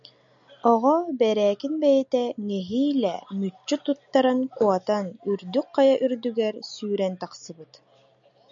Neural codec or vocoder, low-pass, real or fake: none; 7.2 kHz; real